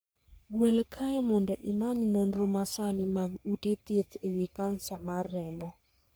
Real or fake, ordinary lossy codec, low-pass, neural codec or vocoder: fake; none; none; codec, 44.1 kHz, 3.4 kbps, Pupu-Codec